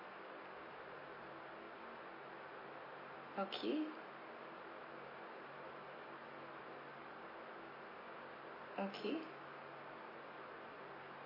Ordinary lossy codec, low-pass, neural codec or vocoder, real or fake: MP3, 24 kbps; 5.4 kHz; none; real